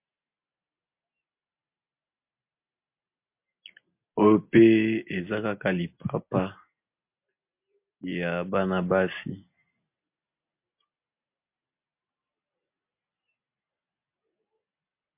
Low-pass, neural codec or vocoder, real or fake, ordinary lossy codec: 3.6 kHz; none; real; MP3, 32 kbps